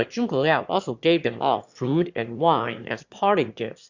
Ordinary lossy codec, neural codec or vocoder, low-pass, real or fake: Opus, 64 kbps; autoencoder, 22.05 kHz, a latent of 192 numbers a frame, VITS, trained on one speaker; 7.2 kHz; fake